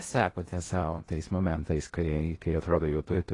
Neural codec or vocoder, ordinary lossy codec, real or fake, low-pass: codec, 16 kHz in and 24 kHz out, 0.8 kbps, FocalCodec, streaming, 65536 codes; AAC, 32 kbps; fake; 10.8 kHz